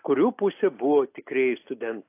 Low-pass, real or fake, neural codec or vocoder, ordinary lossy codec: 3.6 kHz; real; none; AAC, 24 kbps